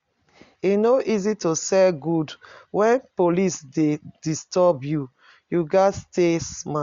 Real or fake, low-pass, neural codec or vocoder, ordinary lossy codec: real; 7.2 kHz; none; Opus, 64 kbps